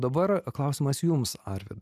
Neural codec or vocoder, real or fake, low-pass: none; real; 14.4 kHz